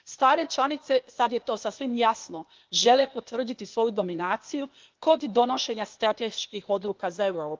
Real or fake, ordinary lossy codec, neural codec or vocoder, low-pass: fake; Opus, 32 kbps; codec, 16 kHz, 0.8 kbps, ZipCodec; 7.2 kHz